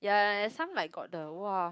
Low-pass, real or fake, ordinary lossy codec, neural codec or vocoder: none; fake; none; codec, 16 kHz, 6 kbps, DAC